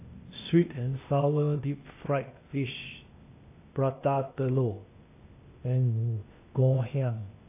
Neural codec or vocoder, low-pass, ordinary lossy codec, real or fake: codec, 16 kHz, 0.8 kbps, ZipCodec; 3.6 kHz; AAC, 24 kbps; fake